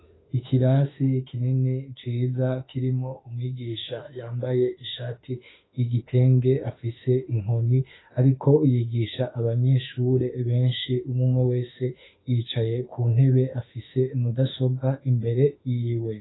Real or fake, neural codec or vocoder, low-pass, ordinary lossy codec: fake; autoencoder, 48 kHz, 32 numbers a frame, DAC-VAE, trained on Japanese speech; 7.2 kHz; AAC, 16 kbps